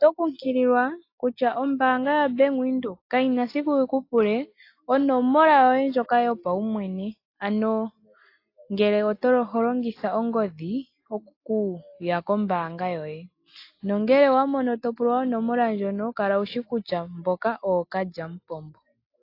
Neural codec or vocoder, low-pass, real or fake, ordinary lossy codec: none; 5.4 kHz; real; AAC, 32 kbps